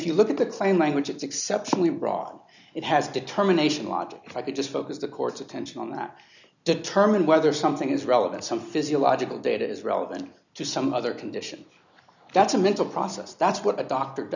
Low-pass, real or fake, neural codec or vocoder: 7.2 kHz; real; none